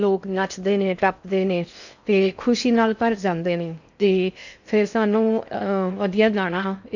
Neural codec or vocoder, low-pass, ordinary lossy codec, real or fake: codec, 16 kHz in and 24 kHz out, 0.6 kbps, FocalCodec, streaming, 4096 codes; 7.2 kHz; none; fake